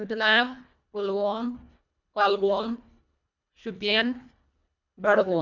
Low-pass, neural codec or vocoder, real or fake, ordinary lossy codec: 7.2 kHz; codec, 24 kHz, 1.5 kbps, HILCodec; fake; none